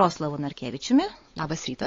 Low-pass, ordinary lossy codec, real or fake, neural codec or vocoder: 7.2 kHz; AAC, 32 kbps; fake; codec, 16 kHz, 4 kbps, X-Codec, WavLM features, trained on Multilingual LibriSpeech